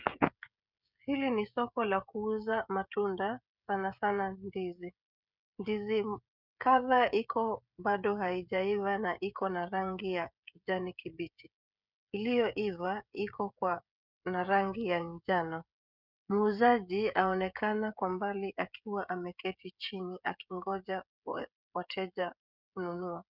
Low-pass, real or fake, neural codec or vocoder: 5.4 kHz; fake; codec, 16 kHz, 16 kbps, FreqCodec, smaller model